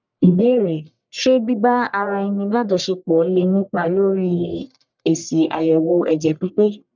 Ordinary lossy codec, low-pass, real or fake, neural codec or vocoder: none; 7.2 kHz; fake; codec, 44.1 kHz, 1.7 kbps, Pupu-Codec